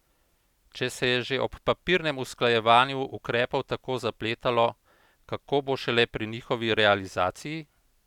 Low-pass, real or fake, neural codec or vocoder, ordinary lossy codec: 19.8 kHz; real; none; none